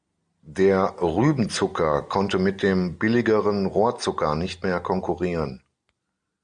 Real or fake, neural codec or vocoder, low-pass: real; none; 9.9 kHz